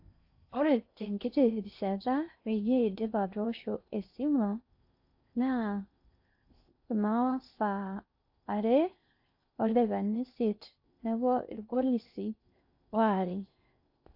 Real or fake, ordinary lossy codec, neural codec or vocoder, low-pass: fake; AAC, 48 kbps; codec, 16 kHz in and 24 kHz out, 0.6 kbps, FocalCodec, streaming, 4096 codes; 5.4 kHz